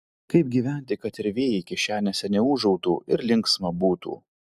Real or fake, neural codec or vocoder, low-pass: real; none; 14.4 kHz